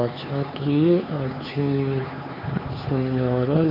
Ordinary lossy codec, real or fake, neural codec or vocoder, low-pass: MP3, 48 kbps; fake; codec, 16 kHz, 4 kbps, X-Codec, HuBERT features, trained on LibriSpeech; 5.4 kHz